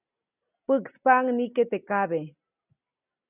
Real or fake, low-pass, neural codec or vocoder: real; 3.6 kHz; none